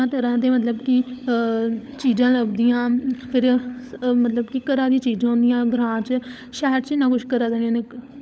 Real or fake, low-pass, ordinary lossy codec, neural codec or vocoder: fake; none; none; codec, 16 kHz, 16 kbps, FunCodec, trained on LibriTTS, 50 frames a second